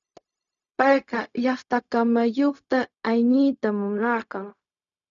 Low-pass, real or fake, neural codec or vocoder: 7.2 kHz; fake; codec, 16 kHz, 0.4 kbps, LongCat-Audio-Codec